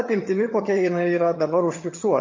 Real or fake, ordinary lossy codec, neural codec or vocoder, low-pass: fake; MP3, 32 kbps; vocoder, 22.05 kHz, 80 mel bands, HiFi-GAN; 7.2 kHz